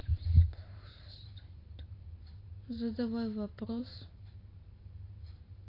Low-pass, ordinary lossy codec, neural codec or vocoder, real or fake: 5.4 kHz; AAC, 24 kbps; vocoder, 44.1 kHz, 128 mel bands every 512 samples, BigVGAN v2; fake